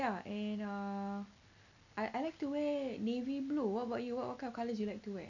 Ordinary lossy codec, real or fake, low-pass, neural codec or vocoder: none; real; 7.2 kHz; none